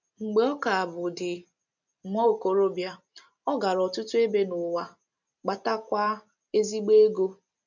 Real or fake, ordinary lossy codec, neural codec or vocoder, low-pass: real; none; none; 7.2 kHz